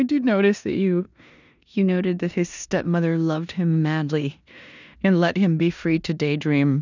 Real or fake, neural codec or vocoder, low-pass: fake; codec, 16 kHz in and 24 kHz out, 0.9 kbps, LongCat-Audio-Codec, four codebook decoder; 7.2 kHz